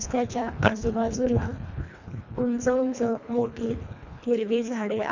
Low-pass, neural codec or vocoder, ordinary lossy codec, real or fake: 7.2 kHz; codec, 24 kHz, 1.5 kbps, HILCodec; none; fake